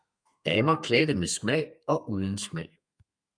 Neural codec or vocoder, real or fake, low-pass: codec, 32 kHz, 1.9 kbps, SNAC; fake; 9.9 kHz